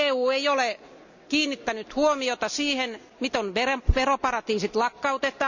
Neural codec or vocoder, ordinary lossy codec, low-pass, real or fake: none; none; 7.2 kHz; real